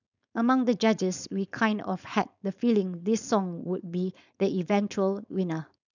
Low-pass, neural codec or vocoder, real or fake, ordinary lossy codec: 7.2 kHz; codec, 16 kHz, 4.8 kbps, FACodec; fake; none